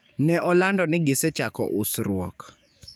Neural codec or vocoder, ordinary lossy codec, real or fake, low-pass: codec, 44.1 kHz, 7.8 kbps, DAC; none; fake; none